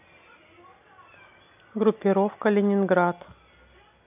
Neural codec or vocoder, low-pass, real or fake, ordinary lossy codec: none; 3.6 kHz; real; AAC, 32 kbps